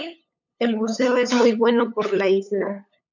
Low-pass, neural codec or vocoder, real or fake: 7.2 kHz; codec, 16 kHz, 8 kbps, FunCodec, trained on LibriTTS, 25 frames a second; fake